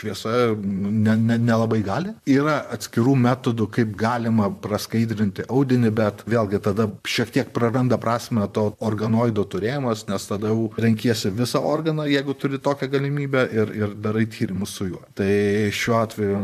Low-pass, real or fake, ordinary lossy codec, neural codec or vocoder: 14.4 kHz; fake; MP3, 96 kbps; vocoder, 44.1 kHz, 128 mel bands, Pupu-Vocoder